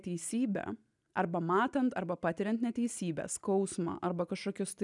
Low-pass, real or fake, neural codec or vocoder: 10.8 kHz; real; none